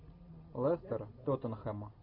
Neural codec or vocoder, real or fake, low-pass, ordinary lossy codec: none; real; 5.4 kHz; MP3, 48 kbps